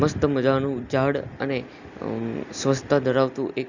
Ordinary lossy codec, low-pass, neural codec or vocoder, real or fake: none; 7.2 kHz; none; real